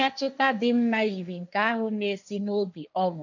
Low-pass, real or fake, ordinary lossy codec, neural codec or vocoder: 7.2 kHz; fake; none; codec, 16 kHz, 1.1 kbps, Voila-Tokenizer